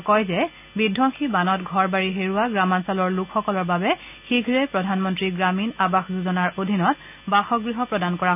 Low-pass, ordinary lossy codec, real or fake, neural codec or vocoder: 3.6 kHz; none; real; none